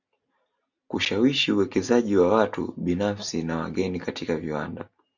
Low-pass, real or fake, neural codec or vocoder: 7.2 kHz; real; none